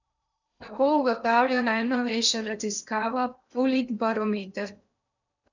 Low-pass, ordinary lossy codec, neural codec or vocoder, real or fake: 7.2 kHz; none; codec, 16 kHz in and 24 kHz out, 0.8 kbps, FocalCodec, streaming, 65536 codes; fake